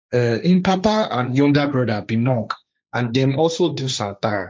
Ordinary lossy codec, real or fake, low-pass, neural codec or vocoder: none; fake; none; codec, 16 kHz, 1.1 kbps, Voila-Tokenizer